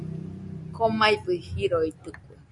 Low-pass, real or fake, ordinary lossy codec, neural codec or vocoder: 10.8 kHz; real; AAC, 64 kbps; none